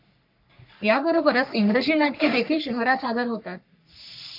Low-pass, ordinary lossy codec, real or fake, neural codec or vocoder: 5.4 kHz; MP3, 48 kbps; fake; codec, 44.1 kHz, 3.4 kbps, Pupu-Codec